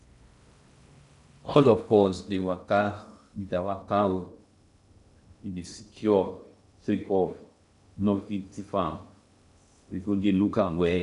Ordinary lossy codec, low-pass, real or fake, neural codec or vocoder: none; 10.8 kHz; fake; codec, 16 kHz in and 24 kHz out, 0.6 kbps, FocalCodec, streaming, 4096 codes